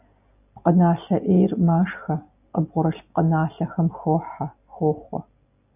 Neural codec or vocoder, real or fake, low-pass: none; real; 3.6 kHz